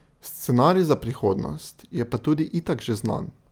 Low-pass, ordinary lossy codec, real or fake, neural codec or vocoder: 19.8 kHz; Opus, 32 kbps; real; none